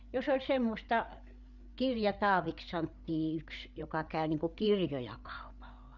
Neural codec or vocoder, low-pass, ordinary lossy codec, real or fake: codec, 16 kHz, 8 kbps, FreqCodec, larger model; 7.2 kHz; none; fake